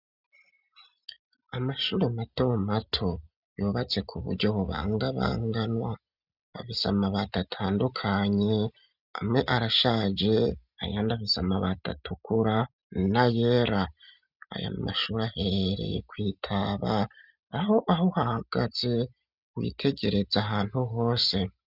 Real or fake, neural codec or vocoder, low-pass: real; none; 5.4 kHz